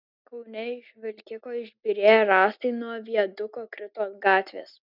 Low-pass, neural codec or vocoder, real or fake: 5.4 kHz; none; real